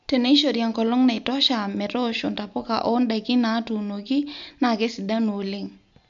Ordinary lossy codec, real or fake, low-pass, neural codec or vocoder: MP3, 64 kbps; real; 7.2 kHz; none